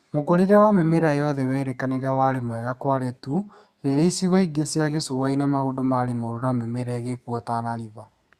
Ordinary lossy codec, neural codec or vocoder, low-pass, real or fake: Opus, 64 kbps; codec, 32 kHz, 1.9 kbps, SNAC; 14.4 kHz; fake